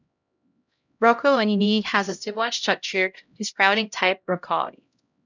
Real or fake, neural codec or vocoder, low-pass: fake; codec, 16 kHz, 0.5 kbps, X-Codec, HuBERT features, trained on LibriSpeech; 7.2 kHz